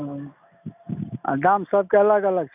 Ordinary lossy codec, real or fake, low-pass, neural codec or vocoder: none; real; 3.6 kHz; none